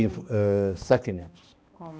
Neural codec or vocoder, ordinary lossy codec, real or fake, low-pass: codec, 16 kHz, 1 kbps, X-Codec, HuBERT features, trained on balanced general audio; none; fake; none